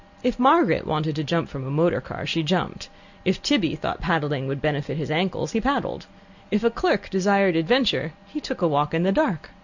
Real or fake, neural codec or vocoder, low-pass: real; none; 7.2 kHz